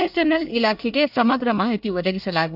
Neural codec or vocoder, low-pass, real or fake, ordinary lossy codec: codec, 24 kHz, 1 kbps, SNAC; 5.4 kHz; fake; AAC, 48 kbps